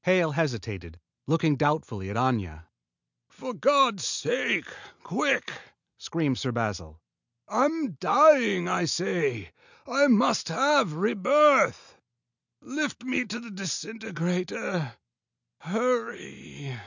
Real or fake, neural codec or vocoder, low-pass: real; none; 7.2 kHz